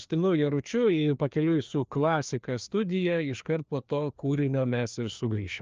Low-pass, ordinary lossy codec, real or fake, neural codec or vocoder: 7.2 kHz; Opus, 24 kbps; fake; codec, 16 kHz, 2 kbps, FreqCodec, larger model